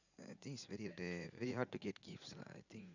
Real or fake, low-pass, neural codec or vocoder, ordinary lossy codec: fake; 7.2 kHz; vocoder, 44.1 kHz, 80 mel bands, Vocos; none